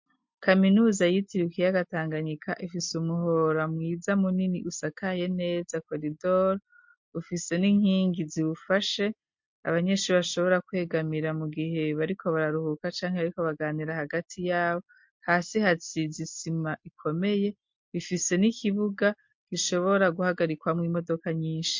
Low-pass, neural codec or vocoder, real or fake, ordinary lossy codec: 7.2 kHz; none; real; MP3, 48 kbps